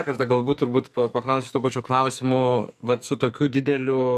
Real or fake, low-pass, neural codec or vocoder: fake; 14.4 kHz; codec, 44.1 kHz, 2.6 kbps, SNAC